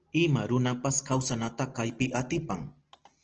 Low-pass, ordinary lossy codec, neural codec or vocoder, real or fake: 7.2 kHz; Opus, 16 kbps; none; real